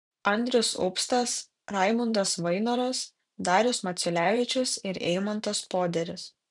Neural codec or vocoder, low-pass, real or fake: vocoder, 44.1 kHz, 128 mel bands every 512 samples, BigVGAN v2; 10.8 kHz; fake